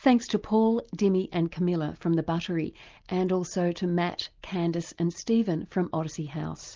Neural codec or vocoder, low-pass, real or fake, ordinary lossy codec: none; 7.2 kHz; real; Opus, 32 kbps